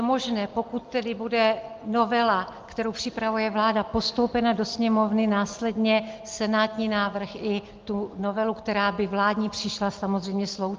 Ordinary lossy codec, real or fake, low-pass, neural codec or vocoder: Opus, 32 kbps; real; 7.2 kHz; none